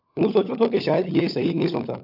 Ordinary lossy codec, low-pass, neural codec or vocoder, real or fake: AAC, 48 kbps; 5.4 kHz; codec, 16 kHz, 16 kbps, FunCodec, trained on LibriTTS, 50 frames a second; fake